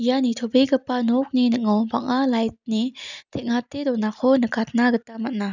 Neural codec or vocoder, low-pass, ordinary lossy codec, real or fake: none; 7.2 kHz; none; real